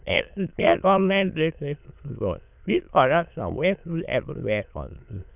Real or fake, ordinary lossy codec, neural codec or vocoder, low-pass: fake; none; autoencoder, 22.05 kHz, a latent of 192 numbers a frame, VITS, trained on many speakers; 3.6 kHz